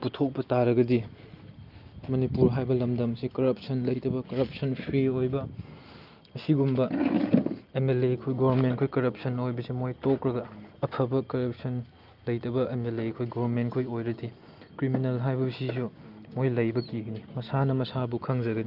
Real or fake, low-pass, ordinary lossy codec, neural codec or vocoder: real; 5.4 kHz; Opus, 24 kbps; none